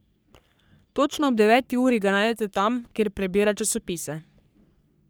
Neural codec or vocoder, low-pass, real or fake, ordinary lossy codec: codec, 44.1 kHz, 3.4 kbps, Pupu-Codec; none; fake; none